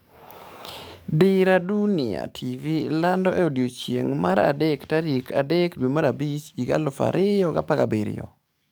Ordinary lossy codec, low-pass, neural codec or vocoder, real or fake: none; none; codec, 44.1 kHz, 7.8 kbps, DAC; fake